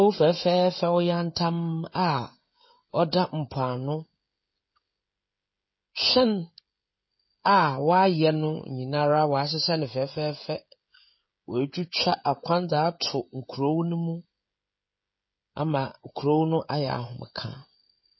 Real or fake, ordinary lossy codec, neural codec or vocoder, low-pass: fake; MP3, 24 kbps; codec, 16 kHz, 16 kbps, FreqCodec, smaller model; 7.2 kHz